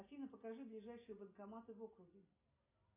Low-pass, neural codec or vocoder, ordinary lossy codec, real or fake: 3.6 kHz; none; MP3, 24 kbps; real